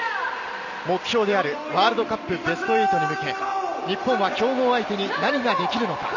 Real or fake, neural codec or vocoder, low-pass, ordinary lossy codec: real; none; 7.2 kHz; none